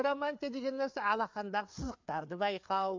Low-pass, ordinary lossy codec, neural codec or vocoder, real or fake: 7.2 kHz; MP3, 48 kbps; codec, 16 kHz, 4 kbps, FreqCodec, larger model; fake